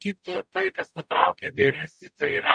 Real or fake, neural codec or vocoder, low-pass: fake; codec, 44.1 kHz, 0.9 kbps, DAC; 9.9 kHz